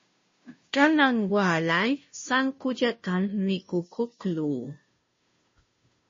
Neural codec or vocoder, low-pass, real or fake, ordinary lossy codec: codec, 16 kHz, 0.5 kbps, FunCodec, trained on Chinese and English, 25 frames a second; 7.2 kHz; fake; MP3, 32 kbps